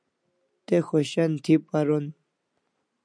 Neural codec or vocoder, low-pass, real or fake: none; 9.9 kHz; real